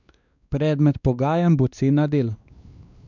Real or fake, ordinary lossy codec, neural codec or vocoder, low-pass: fake; none; codec, 16 kHz, 2 kbps, X-Codec, WavLM features, trained on Multilingual LibriSpeech; 7.2 kHz